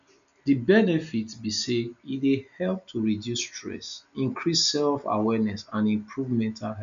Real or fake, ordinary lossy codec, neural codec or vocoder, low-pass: real; none; none; 7.2 kHz